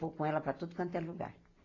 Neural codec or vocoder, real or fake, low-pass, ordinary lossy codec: none; real; 7.2 kHz; AAC, 32 kbps